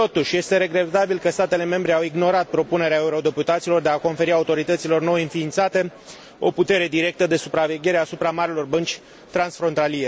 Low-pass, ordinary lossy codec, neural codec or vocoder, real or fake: none; none; none; real